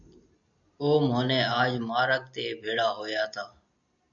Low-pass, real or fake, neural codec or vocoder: 7.2 kHz; real; none